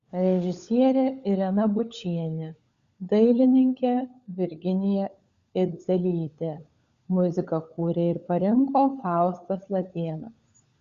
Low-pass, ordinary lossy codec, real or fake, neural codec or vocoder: 7.2 kHz; Opus, 64 kbps; fake; codec, 16 kHz, 16 kbps, FunCodec, trained on LibriTTS, 50 frames a second